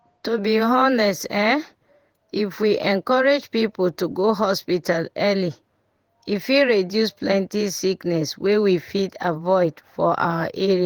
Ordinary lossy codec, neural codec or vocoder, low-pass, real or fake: Opus, 24 kbps; vocoder, 48 kHz, 128 mel bands, Vocos; 19.8 kHz; fake